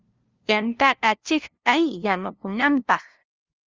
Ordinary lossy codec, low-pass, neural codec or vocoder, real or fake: Opus, 32 kbps; 7.2 kHz; codec, 16 kHz, 0.5 kbps, FunCodec, trained on LibriTTS, 25 frames a second; fake